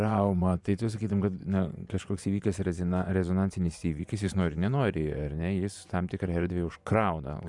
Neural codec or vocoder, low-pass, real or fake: vocoder, 24 kHz, 100 mel bands, Vocos; 10.8 kHz; fake